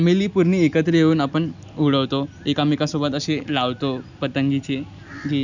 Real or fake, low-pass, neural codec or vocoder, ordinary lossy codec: real; 7.2 kHz; none; none